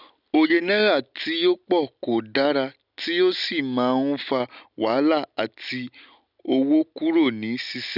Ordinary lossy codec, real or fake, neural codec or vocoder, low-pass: none; real; none; 5.4 kHz